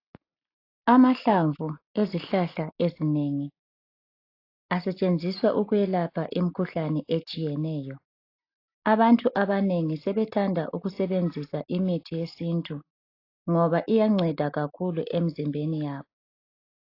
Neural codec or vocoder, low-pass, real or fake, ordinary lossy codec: none; 5.4 kHz; real; AAC, 32 kbps